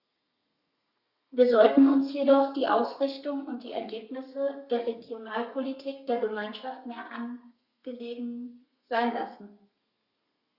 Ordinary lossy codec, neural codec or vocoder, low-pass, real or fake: Opus, 64 kbps; codec, 32 kHz, 1.9 kbps, SNAC; 5.4 kHz; fake